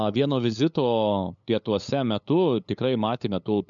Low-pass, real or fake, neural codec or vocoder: 7.2 kHz; fake; codec, 16 kHz, 8 kbps, FunCodec, trained on LibriTTS, 25 frames a second